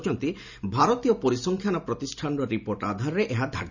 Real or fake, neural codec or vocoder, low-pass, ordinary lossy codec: real; none; 7.2 kHz; none